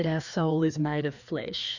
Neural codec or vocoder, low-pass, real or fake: codec, 16 kHz, 2 kbps, FreqCodec, larger model; 7.2 kHz; fake